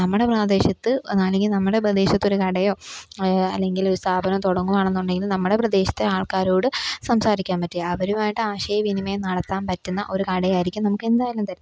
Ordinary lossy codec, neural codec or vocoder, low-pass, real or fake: none; none; none; real